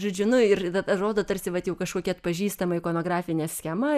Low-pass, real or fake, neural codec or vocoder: 14.4 kHz; real; none